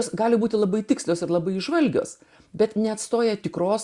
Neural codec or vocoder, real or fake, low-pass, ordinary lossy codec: none; real; 10.8 kHz; Opus, 64 kbps